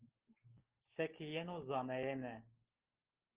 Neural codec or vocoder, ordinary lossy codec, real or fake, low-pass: none; Opus, 16 kbps; real; 3.6 kHz